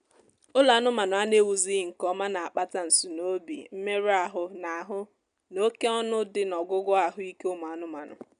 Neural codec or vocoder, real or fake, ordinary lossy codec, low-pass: none; real; none; 9.9 kHz